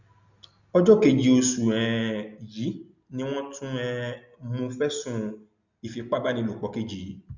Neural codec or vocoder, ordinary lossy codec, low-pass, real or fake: none; none; 7.2 kHz; real